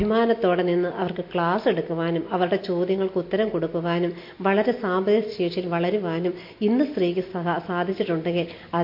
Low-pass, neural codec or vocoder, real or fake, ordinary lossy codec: 5.4 kHz; none; real; MP3, 32 kbps